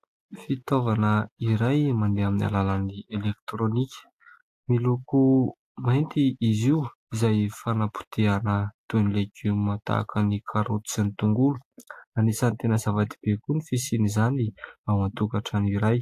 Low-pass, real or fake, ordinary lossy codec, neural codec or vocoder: 14.4 kHz; real; AAC, 64 kbps; none